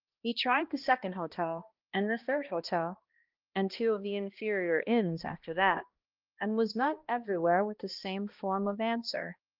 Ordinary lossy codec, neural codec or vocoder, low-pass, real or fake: Opus, 32 kbps; codec, 16 kHz, 1 kbps, X-Codec, HuBERT features, trained on balanced general audio; 5.4 kHz; fake